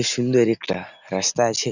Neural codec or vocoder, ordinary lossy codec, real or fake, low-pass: none; none; real; 7.2 kHz